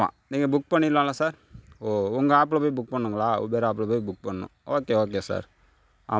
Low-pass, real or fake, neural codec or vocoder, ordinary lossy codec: none; real; none; none